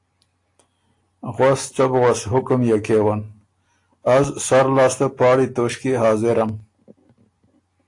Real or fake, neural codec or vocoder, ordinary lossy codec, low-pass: real; none; AAC, 48 kbps; 10.8 kHz